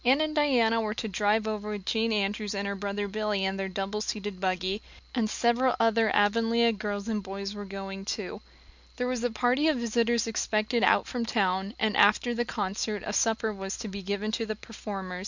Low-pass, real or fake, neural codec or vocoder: 7.2 kHz; real; none